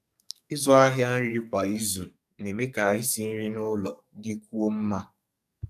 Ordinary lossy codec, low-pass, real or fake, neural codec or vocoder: none; 14.4 kHz; fake; codec, 44.1 kHz, 2.6 kbps, SNAC